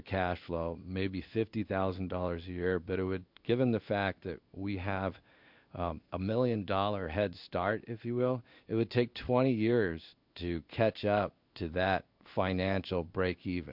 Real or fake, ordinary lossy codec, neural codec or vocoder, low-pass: fake; MP3, 48 kbps; codec, 16 kHz in and 24 kHz out, 1 kbps, XY-Tokenizer; 5.4 kHz